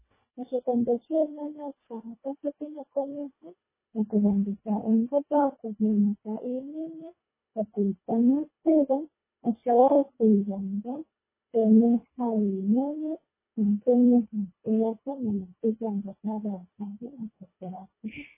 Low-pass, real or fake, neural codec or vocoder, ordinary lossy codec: 3.6 kHz; fake; codec, 24 kHz, 1.5 kbps, HILCodec; MP3, 16 kbps